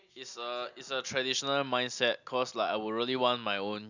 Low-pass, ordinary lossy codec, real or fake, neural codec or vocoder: 7.2 kHz; none; real; none